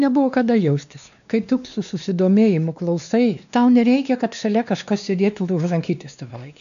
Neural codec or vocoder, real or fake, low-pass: codec, 16 kHz, 2 kbps, X-Codec, WavLM features, trained on Multilingual LibriSpeech; fake; 7.2 kHz